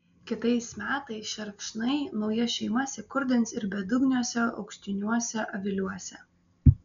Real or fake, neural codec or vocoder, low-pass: real; none; 7.2 kHz